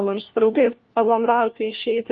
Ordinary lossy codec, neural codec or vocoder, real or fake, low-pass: Opus, 24 kbps; codec, 16 kHz, 1 kbps, FunCodec, trained on LibriTTS, 50 frames a second; fake; 7.2 kHz